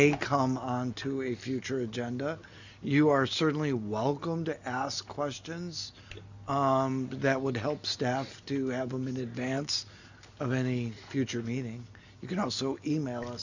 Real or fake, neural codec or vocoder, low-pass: real; none; 7.2 kHz